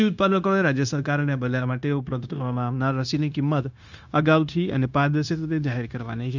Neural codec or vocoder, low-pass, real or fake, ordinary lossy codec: codec, 16 kHz, 0.9 kbps, LongCat-Audio-Codec; 7.2 kHz; fake; none